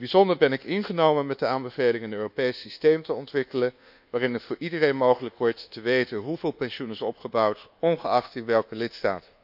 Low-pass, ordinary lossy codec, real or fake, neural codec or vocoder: 5.4 kHz; none; fake; codec, 24 kHz, 1.2 kbps, DualCodec